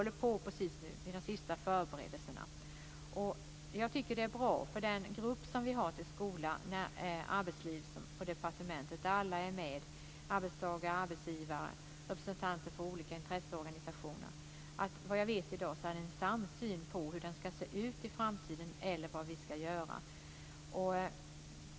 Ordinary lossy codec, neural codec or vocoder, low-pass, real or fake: none; none; none; real